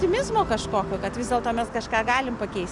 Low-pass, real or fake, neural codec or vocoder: 10.8 kHz; real; none